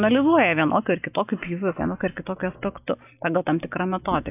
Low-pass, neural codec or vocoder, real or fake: 3.6 kHz; none; real